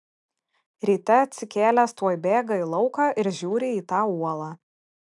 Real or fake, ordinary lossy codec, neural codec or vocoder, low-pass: real; MP3, 96 kbps; none; 10.8 kHz